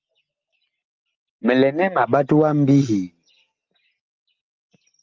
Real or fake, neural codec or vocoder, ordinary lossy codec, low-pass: real; none; Opus, 24 kbps; 7.2 kHz